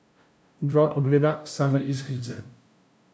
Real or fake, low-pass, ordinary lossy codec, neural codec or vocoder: fake; none; none; codec, 16 kHz, 0.5 kbps, FunCodec, trained on LibriTTS, 25 frames a second